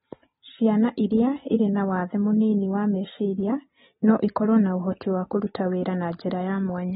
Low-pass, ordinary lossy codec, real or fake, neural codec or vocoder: 19.8 kHz; AAC, 16 kbps; real; none